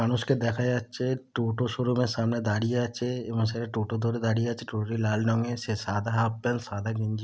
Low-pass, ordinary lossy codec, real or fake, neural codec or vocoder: none; none; real; none